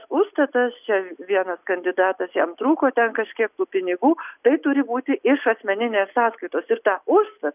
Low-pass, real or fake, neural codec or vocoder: 3.6 kHz; real; none